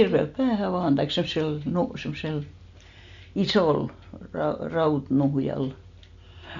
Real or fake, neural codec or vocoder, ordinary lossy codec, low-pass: real; none; MP3, 64 kbps; 7.2 kHz